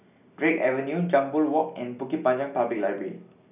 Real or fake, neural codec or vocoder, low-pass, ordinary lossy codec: real; none; 3.6 kHz; none